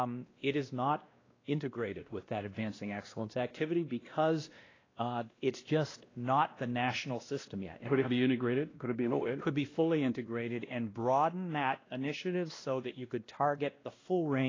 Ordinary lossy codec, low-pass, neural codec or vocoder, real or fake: AAC, 32 kbps; 7.2 kHz; codec, 16 kHz, 1 kbps, X-Codec, WavLM features, trained on Multilingual LibriSpeech; fake